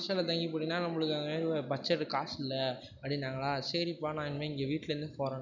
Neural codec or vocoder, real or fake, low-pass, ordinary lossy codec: none; real; 7.2 kHz; none